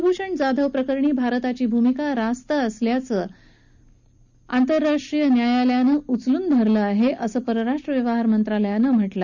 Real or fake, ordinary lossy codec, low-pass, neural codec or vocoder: real; none; 7.2 kHz; none